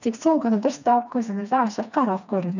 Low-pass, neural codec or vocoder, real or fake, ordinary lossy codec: 7.2 kHz; codec, 16 kHz, 2 kbps, FreqCodec, smaller model; fake; none